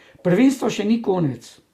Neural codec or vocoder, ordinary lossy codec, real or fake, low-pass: none; Opus, 64 kbps; real; 14.4 kHz